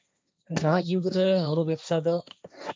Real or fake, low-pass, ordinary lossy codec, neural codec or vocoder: fake; none; none; codec, 16 kHz, 1.1 kbps, Voila-Tokenizer